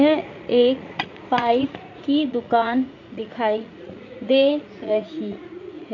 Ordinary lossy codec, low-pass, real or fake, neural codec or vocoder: none; 7.2 kHz; fake; vocoder, 44.1 kHz, 80 mel bands, Vocos